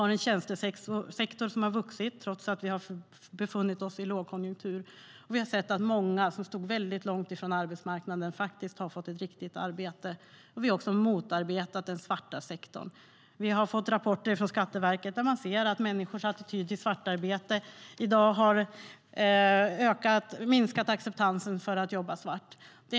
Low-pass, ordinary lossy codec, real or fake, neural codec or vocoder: none; none; real; none